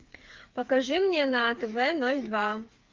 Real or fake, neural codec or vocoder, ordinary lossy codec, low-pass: fake; codec, 16 kHz, 4 kbps, FreqCodec, smaller model; Opus, 32 kbps; 7.2 kHz